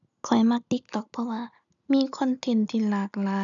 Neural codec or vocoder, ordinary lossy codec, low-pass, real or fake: none; none; 7.2 kHz; real